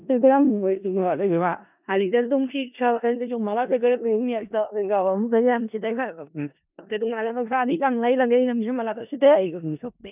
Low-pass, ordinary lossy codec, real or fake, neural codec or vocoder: 3.6 kHz; none; fake; codec, 16 kHz in and 24 kHz out, 0.4 kbps, LongCat-Audio-Codec, four codebook decoder